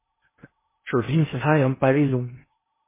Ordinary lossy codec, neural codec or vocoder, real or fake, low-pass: MP3, 16 kbps; codec, 16 kHz in and 24 kHz out, 0.6 kbps, FocalCodec, streaming, 2048 codes; fake; 3.6 kHz